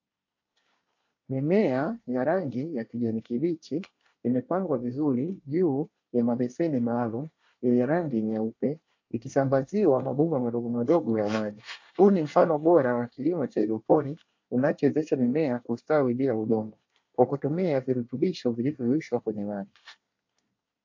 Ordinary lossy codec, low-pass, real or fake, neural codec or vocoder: AAC, 48 kbps; 7.2 kHz; fake; codec, 24 kHz, 1 kbps, SNAC